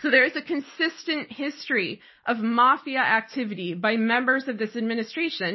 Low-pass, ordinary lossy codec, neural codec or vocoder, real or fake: 7.2 kHz; MP3, 24 kbps; none; real